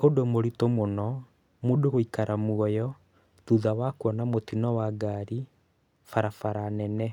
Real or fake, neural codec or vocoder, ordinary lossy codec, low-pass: real; none; none; 19.8 kHz